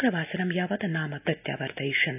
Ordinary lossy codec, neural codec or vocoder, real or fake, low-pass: none; none; real; 3.6 kHz